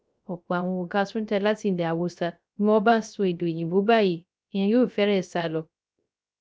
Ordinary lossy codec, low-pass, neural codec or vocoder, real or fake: none; none; codec, 16 kHz, 0.3 kbps, FocalCodec; fake